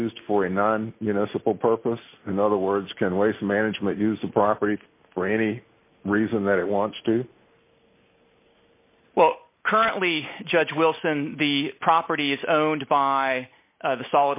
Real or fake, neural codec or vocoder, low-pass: real; none; 3.6 kHz